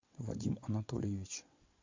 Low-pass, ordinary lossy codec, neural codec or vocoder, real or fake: 7.2 kHz; MP3, 48 kbps; vocoder, 22.05 kHz, 80 mel bands, WaveNeXt; fake